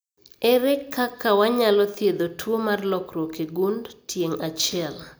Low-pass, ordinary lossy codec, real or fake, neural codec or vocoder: none; none; real; none